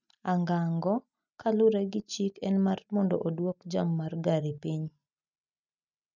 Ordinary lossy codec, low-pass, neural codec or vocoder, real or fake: none; 7.2 kHz; none; real